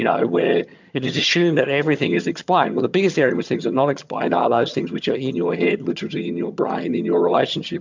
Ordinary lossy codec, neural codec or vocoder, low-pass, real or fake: MP3, 64 kbps; vocoder, 22.05 kHz, 80 mel bands, HiFi-GAN; 7.2 kHz; fake